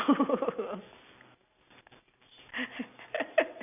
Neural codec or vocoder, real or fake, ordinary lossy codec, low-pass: none; real; none; 3.6 kHz